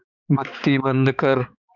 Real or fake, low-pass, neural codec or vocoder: fake; 7.2 kHz; codec, 16 kHz, 4 kbps, X-Codec, HuBERT features, trained on balanced general audio